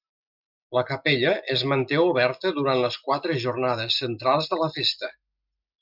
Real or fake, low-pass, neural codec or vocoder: real; 5.4 kHz; none